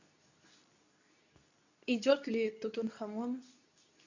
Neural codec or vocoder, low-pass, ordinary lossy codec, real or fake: codec, 24 kHz, 0.9 kbps, WavTokenizer, medium speech release version 2; 7.2 kHz; none; fake